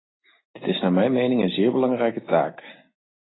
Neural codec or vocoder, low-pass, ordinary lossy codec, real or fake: none; 7.2 kHz; AAC, 16 kbps; real